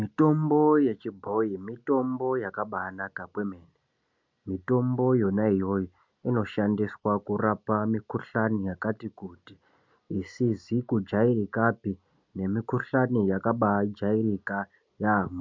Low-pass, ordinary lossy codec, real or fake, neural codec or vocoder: 7.2 kHz; MP3, 64 kbps; real; none